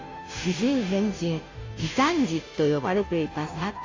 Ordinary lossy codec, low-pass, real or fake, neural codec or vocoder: AAC, 32 kbps; 7.2 kHz; fake; codec, 16 kHz, 0.5 kbps, FunCodec, trained on Chinese and English, 25 frames a second